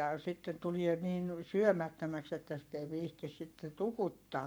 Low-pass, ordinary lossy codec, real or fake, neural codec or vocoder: none; none; fake; codec, 44.1 kHz, 7.8 kbps, Pupu-Codec